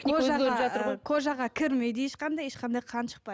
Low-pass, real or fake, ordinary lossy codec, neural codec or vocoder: none; real; none; none